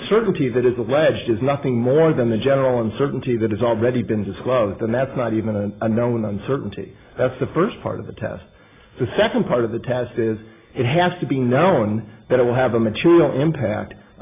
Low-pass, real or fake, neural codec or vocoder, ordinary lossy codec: 3.6 kHz; real; none; AAC, 16 kbps